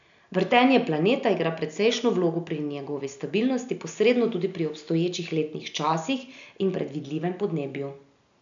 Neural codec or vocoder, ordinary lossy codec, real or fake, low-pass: none; none; real; 7.2 kHz